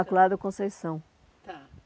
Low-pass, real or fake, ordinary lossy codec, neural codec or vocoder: none; real; none; none